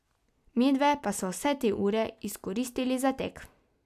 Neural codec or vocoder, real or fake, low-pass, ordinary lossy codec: none; real; 14.4 kHz; none